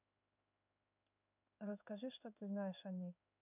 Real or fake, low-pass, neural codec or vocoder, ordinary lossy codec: fake; 3.6 kHz; codec, 16 kHz in and 24 kHz out, 1 kbps, XY-Tokenizer; none